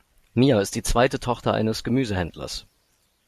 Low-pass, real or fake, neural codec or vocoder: 14.4 kHz; fake; vocoder, 44.1 kHz, 128 mel bands every 512 samples, BigVGAN v2